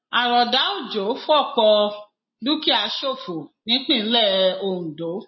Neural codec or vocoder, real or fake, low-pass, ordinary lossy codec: none; real; 7.2 kHz; MP3, 24 kbps